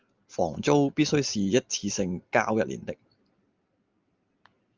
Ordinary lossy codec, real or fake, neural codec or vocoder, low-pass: Opus, 24 kbps; real; none; 7.2 kHz